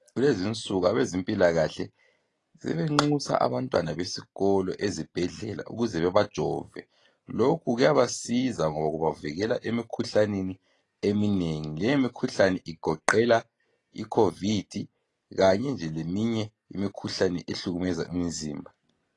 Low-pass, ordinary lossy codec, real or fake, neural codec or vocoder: 10.8 kHz; AAC, 32 kbps; real; none